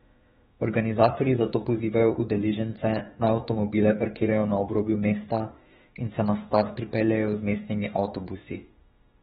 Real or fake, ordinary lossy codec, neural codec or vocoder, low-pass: fake; AAC, 16 kbps; autoencoder, 48 kHz, 32 numbers a frame, DAC-VAE, trained on Japanese speech; 19.8 kHz